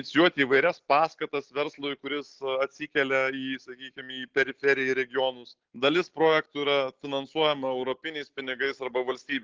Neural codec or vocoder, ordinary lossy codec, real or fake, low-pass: none; Opus, 16 kbps; real; 7.2 kHz